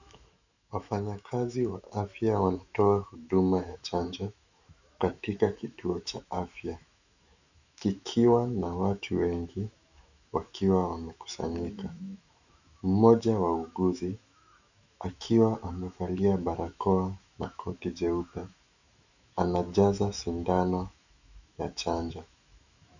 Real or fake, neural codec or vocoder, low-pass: fake; autoencoder, 48 kHz, 128 numbers a frame, DAC-VAE, trained on Japanese speech; 7.2 kHz